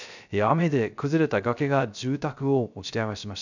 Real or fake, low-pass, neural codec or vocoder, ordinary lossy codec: fake; 7.2 kHz; codec, 16 kHz, 0.3 kbps, FocalCodec; none